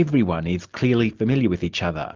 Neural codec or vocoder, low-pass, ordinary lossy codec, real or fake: none; 7.2 kHz; Opus, 32 kbps; real